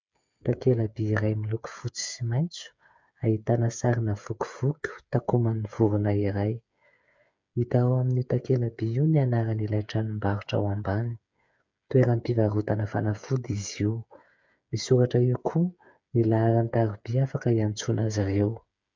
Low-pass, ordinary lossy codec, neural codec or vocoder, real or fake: 7.2 kHz; MP3, 64 kbps; codec, 16 kHz, 8 kbps, FreqCodec, smaller model; fake